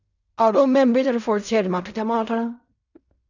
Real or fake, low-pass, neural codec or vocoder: fake; 7.2 kHz; codec, 16 kHz in and 24 kHz out, 0.4 kbps, LongCat-Audio-Codec, fine tuned four codebook decoder